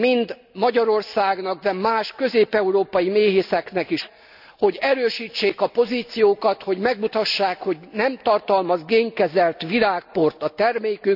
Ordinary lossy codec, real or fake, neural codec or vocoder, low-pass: none; real; none; 5.4 kHz